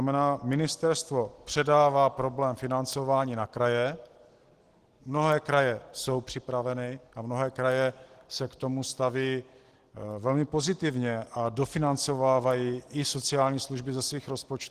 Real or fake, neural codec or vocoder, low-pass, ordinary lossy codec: real; none; 14.4 kHz; Opus, 16 kbps